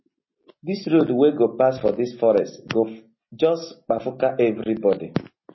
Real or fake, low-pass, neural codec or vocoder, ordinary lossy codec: real; 7.2 kHz; none; MP3, 24 kbps